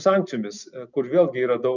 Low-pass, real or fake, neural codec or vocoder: 7.2 kHz; real; none